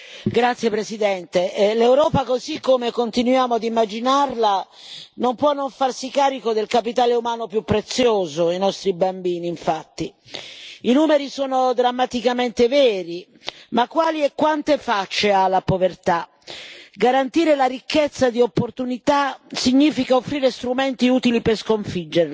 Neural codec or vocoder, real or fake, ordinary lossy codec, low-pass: none; real; none; none